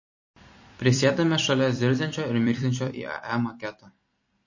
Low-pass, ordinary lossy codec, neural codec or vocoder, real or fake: 7.2 kHz; MP3, 32 kbps; vocoder, 44.1 kHz, 128 mel bands every 256 samples, BigVGAN v2; fake